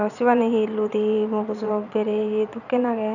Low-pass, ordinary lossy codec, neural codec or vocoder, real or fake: 7.2 kHz; none; vocoder, 22.05 kHz, 80 mel bands, Vocos; fake